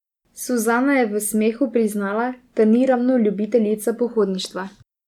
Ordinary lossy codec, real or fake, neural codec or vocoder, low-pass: none; real; none; 19.8 kHz